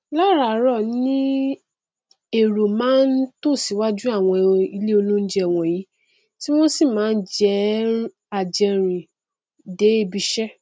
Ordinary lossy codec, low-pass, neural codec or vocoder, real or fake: none; none; none; real